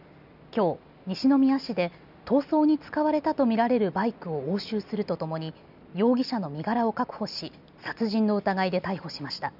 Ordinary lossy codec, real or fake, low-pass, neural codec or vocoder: none; real; 5.4 kHz; none